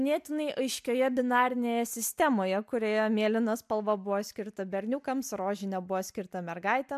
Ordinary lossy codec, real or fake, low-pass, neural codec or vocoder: MP3, 96 kbps; real; 14.4 kHz; none